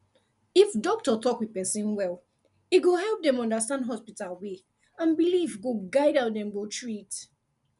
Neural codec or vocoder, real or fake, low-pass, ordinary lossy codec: none; real; 10.8 kHz; none